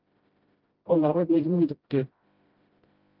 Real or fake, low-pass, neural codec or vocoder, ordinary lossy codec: fake; 5.4 kHz; codec, 16 kHz, 0.5 kbps, FreqCodec, smaller model; Opus, 32 kbps